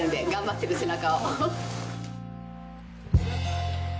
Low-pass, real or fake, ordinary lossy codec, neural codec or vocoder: none; real; none; none